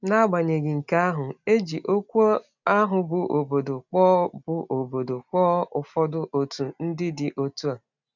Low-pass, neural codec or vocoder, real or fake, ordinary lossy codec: 7.2 kHz; none; real; none